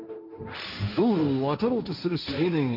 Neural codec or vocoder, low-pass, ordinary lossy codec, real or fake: codec, 16 kHz, 1.1 kbps, Voila-Tokenizer; 5.4 kHz; none; fake